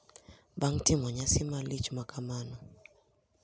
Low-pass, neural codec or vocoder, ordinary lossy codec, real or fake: none; none; none; real